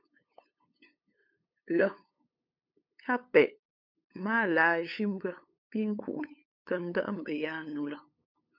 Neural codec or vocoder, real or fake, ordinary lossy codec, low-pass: codec, 16 kHz, 8 kbps, FunCodec, trained on LibriTTS, 25 frames a second; fake; MP3, 48 kbps; 5.4 kHz